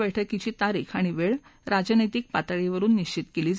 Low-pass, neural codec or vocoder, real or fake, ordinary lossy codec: none; none; real; none